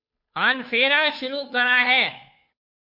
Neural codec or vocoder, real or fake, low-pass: codec, 16 kHz, 2 kbps, FunCodec, trained on Chinese and English, 25 frames a second; fake; 5.4 kHz